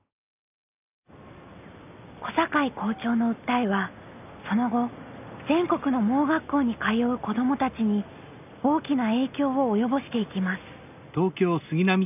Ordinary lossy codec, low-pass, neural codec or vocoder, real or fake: none; 3.6 kHz; none; real